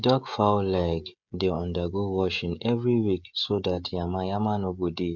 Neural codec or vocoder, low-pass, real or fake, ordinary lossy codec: codec, 16 kHz, 16 kbps, FreqCodec, smaller model; 7.2 kHz; fake; none